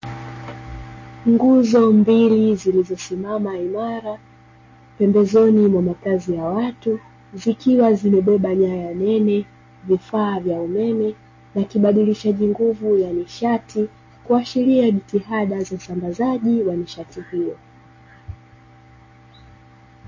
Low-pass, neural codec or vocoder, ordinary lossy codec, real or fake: 7.2 kHz; none; MP3, 32 kbps; real